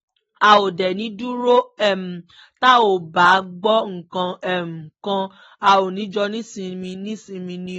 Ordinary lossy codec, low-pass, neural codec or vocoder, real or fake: AAC, 24 kbps; 19.8 kHz; none; real